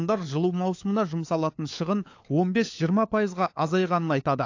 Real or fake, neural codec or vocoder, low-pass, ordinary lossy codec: fake; codec, 16 kHz, 4 kbps, FunCodec, trained on LibriTTS, 50 frames a second; 7.2 kHz; AAC, 48 kbps